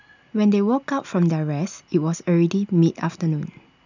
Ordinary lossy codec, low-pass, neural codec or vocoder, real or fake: none; 7.2 kHz; none; real